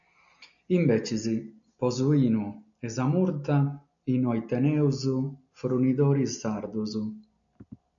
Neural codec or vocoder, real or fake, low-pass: none; real; 7.2 kHz